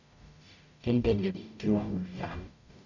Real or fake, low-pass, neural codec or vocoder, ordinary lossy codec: fake; 7.2 kHz; codec, 44.1 kHz, 0.9 kbps, DAC; none